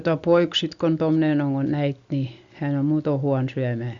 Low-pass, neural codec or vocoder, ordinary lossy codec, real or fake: 7.2 kHz; none; Opus, 64 kbps; real